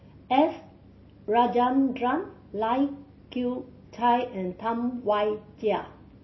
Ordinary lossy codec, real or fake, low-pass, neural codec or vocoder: MP3, 24 kbps; real; 7.2 kHz; none